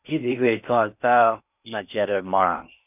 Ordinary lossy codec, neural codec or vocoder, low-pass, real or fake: none; codec, 16 kHz in and 24 kHz out, 0.6 kbps, FocalCodec, streaming, 4096 codes; 3.6 kHz; fake